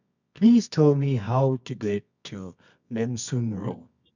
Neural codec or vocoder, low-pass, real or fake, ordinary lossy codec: codec, 24 kHz, 0.9 kbps, WavTokenizer, medium music audio release; 7.2 kHz; fake; none